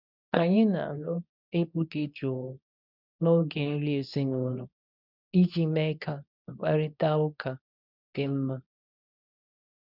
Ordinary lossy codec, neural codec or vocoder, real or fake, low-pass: none; codec, 24 kHz, 0.9 kbps, WavTokenizer, medium speech release version 2; fake; 5.4 kHz